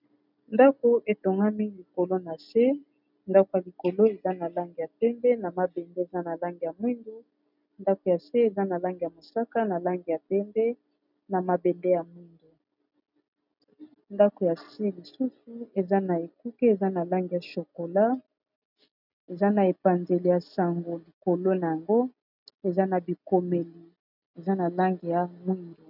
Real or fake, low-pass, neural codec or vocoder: real; 5.4 kHz; none